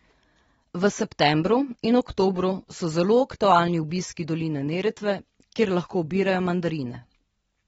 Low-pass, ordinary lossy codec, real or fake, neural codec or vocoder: 19.8 kHz; AAC, 24 kbps; real; none